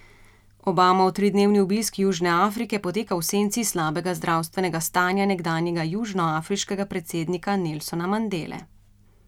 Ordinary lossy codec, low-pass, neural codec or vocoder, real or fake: none; 19.8 kHz; none; real